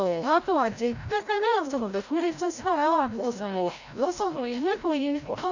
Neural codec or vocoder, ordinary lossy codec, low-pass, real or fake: codec, 16 kHz, 0.5 kbps, FreqCodec, larger model; none; 7.2 kHz; fake